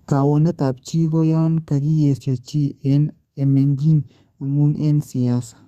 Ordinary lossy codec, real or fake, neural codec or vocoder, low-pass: Opus, 64 kbps; fake; codec, 32 kHz, 1.9 kbps, SNAC; 14.4 kHz